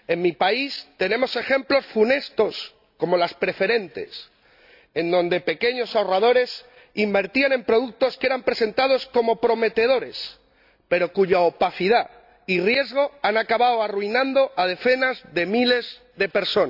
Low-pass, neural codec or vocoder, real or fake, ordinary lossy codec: 5.4 kHz; none; real; AAC, 48 kbps